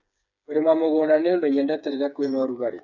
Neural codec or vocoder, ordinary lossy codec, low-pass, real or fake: codec, 16 kHz, 4 kbps, FreqCodec, smaller model; none; 7.2 kHz; fake